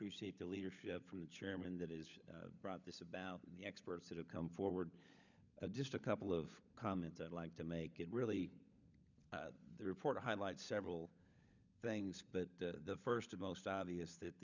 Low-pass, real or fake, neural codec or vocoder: 7.2 kHz; fake; codec, 16 kHz, 16 kbps, FunCodec, trained on LibriTTS, 50 frames a second